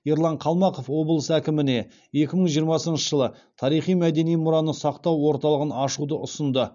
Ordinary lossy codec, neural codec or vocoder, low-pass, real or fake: none; none; 7.2 kHz; real